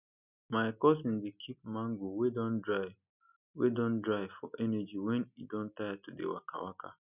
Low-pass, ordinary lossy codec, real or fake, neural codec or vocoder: 3.6 kHz; none; real; none